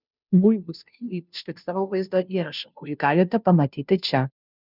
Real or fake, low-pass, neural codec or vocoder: fake; 5.4 kHz; codec, 16 kHz, 0.5 kbps, FunCodec, trained on Chinese and English, 25 frames a second